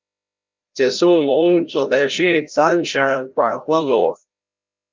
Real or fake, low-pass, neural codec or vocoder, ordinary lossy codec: fake; 7.2 kHz; codec, 16 kHz, 0.5 kbps, FreqCodec, larger model; Opus, 32 kbps